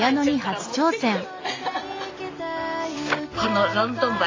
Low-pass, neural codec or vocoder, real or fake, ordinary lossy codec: 7.2 kHz; none; real; AAC, 32 kbps